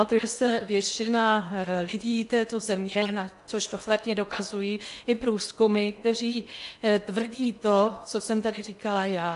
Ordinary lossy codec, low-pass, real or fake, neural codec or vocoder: AAC, 64 kbps; 10.8 kHz; fake; codec, 16 kHz in and 24 kHz out, 0.8 kbps, FocalCodec, streaming, 65536 codes